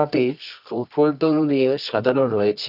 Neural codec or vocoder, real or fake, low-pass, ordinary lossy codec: codec, 24 kHz, 0.9 kbps, WavTokenizer, medium music audio release; fake; 5.4 kHz; none